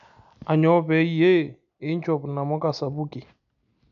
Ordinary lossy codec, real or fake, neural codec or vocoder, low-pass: none; real; none; 7.2 kHz